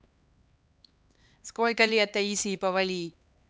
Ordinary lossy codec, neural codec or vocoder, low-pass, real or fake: none; codec, 16 kHz, 2 kbps, X-Codec, HuBERT features, trained on LibriSpeech; none; fake